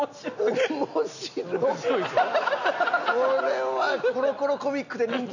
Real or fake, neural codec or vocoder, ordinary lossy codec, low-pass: real; none; none; 7.2 kHz